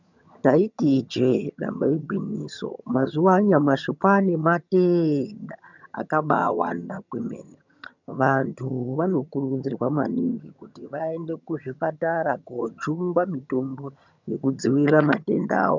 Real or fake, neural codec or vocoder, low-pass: fake; vocoder, 22.05 kHz, 80 mel bands, HiFi-GAN; 7.2 kHz